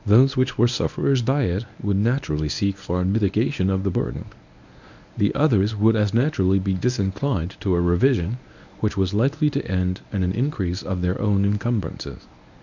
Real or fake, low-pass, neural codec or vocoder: fake; 7.2 kHz; codec, 24 kHz, 0.9 kbps, WavTokenizer, medium speech release version 1